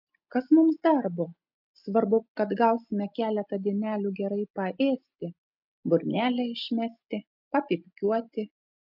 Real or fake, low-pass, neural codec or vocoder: real; 5.4 kHz; none